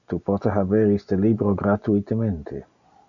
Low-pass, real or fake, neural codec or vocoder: 7.2 kHz; real; none